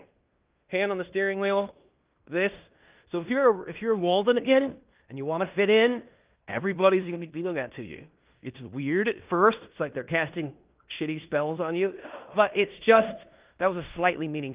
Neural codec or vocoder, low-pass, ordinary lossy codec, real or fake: codec, 16 kHz in and 24 kHz out, 0.9 kbps, LongCat-Audio-Codec, fine tuned four codebook decoder; 3.6 kHz; Opus, 24 kbps; fake